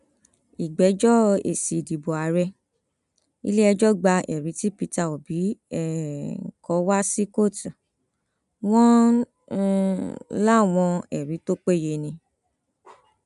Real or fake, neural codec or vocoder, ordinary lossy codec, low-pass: real; none; none; 10.8 kHz